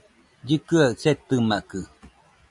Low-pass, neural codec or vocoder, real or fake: 10.8 kHz; none; real